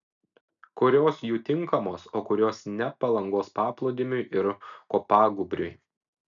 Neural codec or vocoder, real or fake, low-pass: none; real; 7.2 kHz